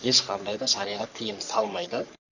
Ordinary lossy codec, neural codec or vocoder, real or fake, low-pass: none; codec, 44.1 kHz, 3.4 kbps, Pupu-Codec; fake; 7.2 kHz